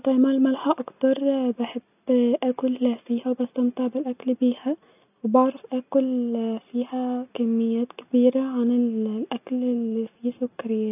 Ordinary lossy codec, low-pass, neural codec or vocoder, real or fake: none; 3.6 kHz; none; real